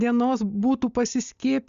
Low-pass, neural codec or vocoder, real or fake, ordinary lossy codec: 7.2 kHz; none; real; Opus, 64 kbps